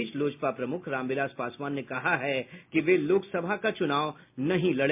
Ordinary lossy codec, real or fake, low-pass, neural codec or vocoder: MP3, 32 kbps; real; 3.6 kHz; none